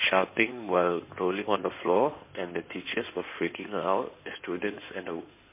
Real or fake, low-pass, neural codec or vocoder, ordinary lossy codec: fake; 3.6 kHz; codec, 16 kHz in and 24 kHz out, 2.2 kbps, FireRedTTS-2 codec; MP3, 24 kbps